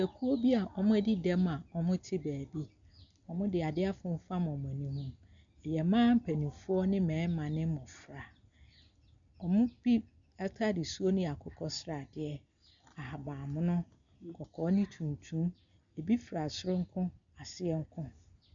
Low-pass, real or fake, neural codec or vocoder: 7.2 kHz; real; none